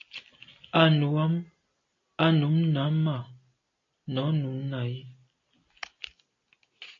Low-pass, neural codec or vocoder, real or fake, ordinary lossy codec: 7.2 kHz; none; real; AAC, 32 kbps